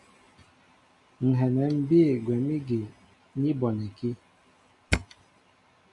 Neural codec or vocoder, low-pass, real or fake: none; 10.8 kHz; real